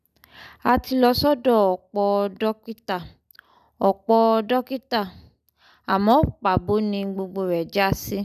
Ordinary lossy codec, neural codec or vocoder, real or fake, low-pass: none; none; real; 14.4 kHz